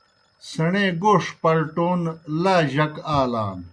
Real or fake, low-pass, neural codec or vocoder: real; 9.9 kHz; none